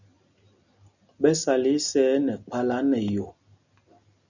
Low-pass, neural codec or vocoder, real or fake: 7.2 kHz; none; real